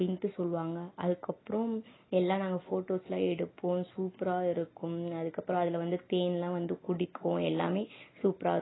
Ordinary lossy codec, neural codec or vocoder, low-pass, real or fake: AAC, 16 kbps; none; 7.2 kHz; real